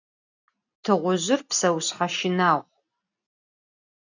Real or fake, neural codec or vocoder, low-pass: real; none; 7.2 kHz